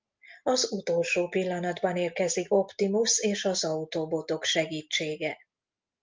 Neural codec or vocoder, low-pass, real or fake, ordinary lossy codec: none; 7.2 kHz; real; Opus, 24 kbps